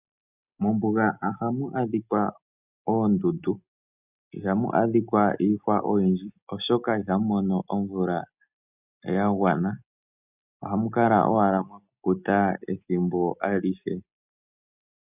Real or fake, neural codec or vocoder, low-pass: real; none; 3.6 kHz